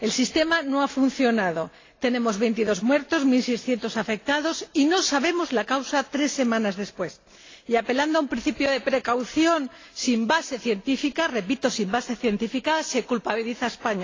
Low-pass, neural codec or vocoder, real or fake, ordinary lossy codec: 7.2 kHz; none; real; AAC, 32 kbps